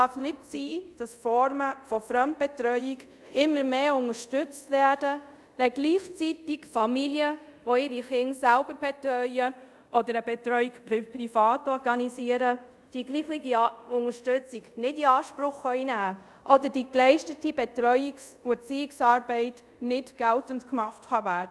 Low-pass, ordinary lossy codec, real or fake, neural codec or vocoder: none; none; fake; codec, 24 kHz, 0.5 kbps, DualCodec